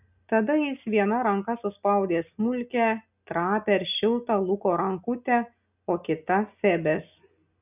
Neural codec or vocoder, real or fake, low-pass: none; real; 3.6 kHz